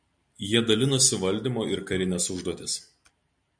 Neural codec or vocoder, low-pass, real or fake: none; 9.9 kHz; real